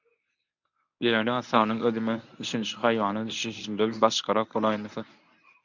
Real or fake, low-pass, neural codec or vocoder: fake; 7.2 kHz; codec, 24 kHz, 0.9 kbps, WavTokenizer, medium speech release version 1